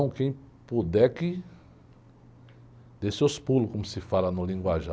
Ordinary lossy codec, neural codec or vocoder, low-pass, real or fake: none; none; none; real